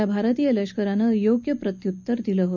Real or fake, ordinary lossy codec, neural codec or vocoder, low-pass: real; none; none; 7.2 kHz